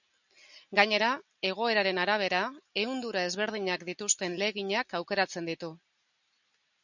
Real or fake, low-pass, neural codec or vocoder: real; 7.2 kHz; none